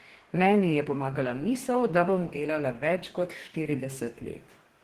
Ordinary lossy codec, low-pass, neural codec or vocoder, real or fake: Opus, 16 kbps; 14.4 kHz; codec, 44.1 kHz, 2.6 kbps, DAC; fake